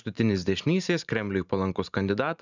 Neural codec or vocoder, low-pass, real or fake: vocoder, 44.1 kHz, 128 mel bands every 512 samples, BigVGAN v2; 7.2 kHz; fake